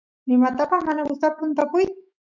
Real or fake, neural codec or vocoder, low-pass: fake; autoencoder, 48 kHz, 128 numbers a frame, DAC-VAE, trained on Japanese speech; 7.2 kHz